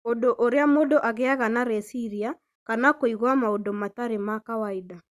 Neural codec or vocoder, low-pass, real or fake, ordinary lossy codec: none; 14.4 kHz; real; Opus, 64 kbps